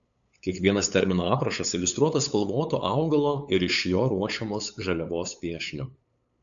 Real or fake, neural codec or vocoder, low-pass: fake; codec, 16 kHz, 8 kbps, FunCodec, trained on LibriTTS, 25 frames a second; 7.2 kHz